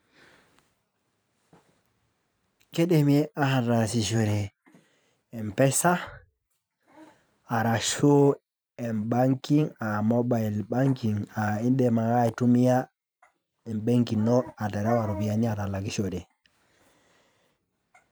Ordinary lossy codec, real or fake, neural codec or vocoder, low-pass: none; fake; vocoder, 44.1 kHz, 128 mel bands every 512 samples, BigVGAN v2; none